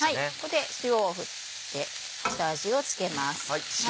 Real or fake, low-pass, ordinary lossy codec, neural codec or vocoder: real; none; none; none